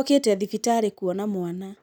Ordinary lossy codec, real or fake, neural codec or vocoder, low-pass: none; real; none; none